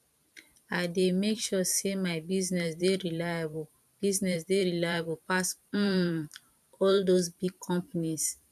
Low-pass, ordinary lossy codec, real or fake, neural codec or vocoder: 14.4 kHz; none; fake; vocoder, 44.1 kHz, 128 mel bands every 512 samples, BigVGAN v2